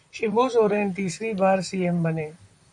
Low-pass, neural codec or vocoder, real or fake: 10.8 kHz; vocoder, 44.1 kHz, 128 mel bands, Pupu-Vocoder; fake